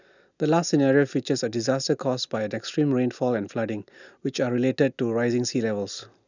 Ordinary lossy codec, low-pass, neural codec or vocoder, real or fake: none; 7.2 kHz; none; real